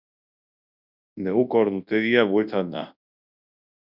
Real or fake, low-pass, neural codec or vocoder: fake; 5.4 kHz; codec, 24 kHz, 0.9 kbps, WavTokenizer, large speech release